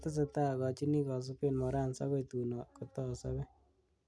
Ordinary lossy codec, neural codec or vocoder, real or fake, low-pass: none; none; real; none